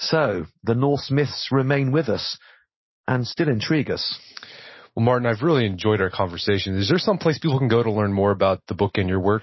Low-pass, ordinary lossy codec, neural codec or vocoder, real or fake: 7.2 kHz; MP3, 24 kbps; none; real